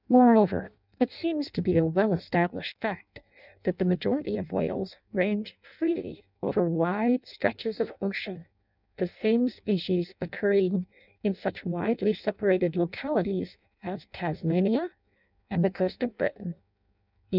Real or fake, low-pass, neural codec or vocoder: fake; 5.4 kHz; codec, 16 kHz in and 24 kHz out, 0.6 kbps, FireRedTTS-2 codec